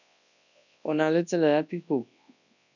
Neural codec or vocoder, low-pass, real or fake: codec, 24 kHz, 0.9 kbps, WavTokenizer, large speech release; 7.2 kHz; fake